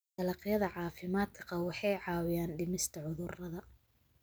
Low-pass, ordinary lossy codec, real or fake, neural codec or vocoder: none; none; real; none